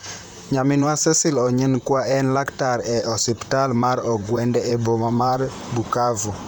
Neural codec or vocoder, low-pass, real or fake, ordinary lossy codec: vocoder, 44.1 kHz, 128 mel bands, Pupu-Vocoder; none; fake; none